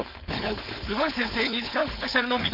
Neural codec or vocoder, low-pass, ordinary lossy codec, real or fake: codec, 16 kHz, 4.8 kbps, FACodec; 5.4 kHz; none; fake